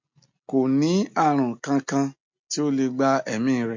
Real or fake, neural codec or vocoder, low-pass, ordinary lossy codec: real; none; 7.2 kHz; MP3, 48 kbps